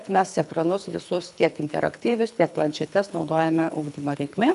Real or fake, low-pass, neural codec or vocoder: fake; 10.8 kHz; codec, 24 kHz, 3 kbps, HILCodec